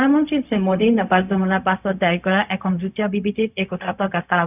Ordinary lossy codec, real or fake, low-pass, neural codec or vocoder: none; fake; 3.6 kHz; codec, 16 kHz, 0.4 kbps, LongCat-Audio-Codec